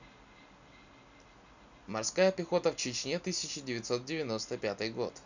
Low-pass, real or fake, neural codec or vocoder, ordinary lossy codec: 7.2 kHz; real; none; none